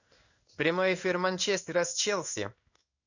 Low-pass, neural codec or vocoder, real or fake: 7.2 kHz; codec, 16 kHz in and 24 kHz out, 1 kbps, XY-Tokenizer; fake